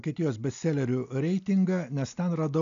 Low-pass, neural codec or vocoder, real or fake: 7.2 kHz; none; real